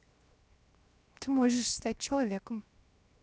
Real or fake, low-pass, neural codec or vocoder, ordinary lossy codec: fake; none; codec, 16 kHz, 0.7 kbps, FocalCodec; none